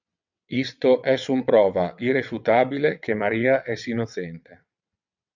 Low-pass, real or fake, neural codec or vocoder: 7.2 kHz; fake; vocoder, 22.05 kHz, 80 mel bands, WaveNeXt